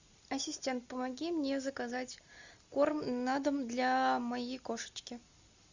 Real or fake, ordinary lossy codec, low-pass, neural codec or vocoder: real; Opus, 64 kbps; 7.2 kHz; none